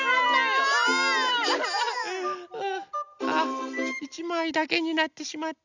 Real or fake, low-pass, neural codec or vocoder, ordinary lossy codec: real; 7.2 kHz; none; none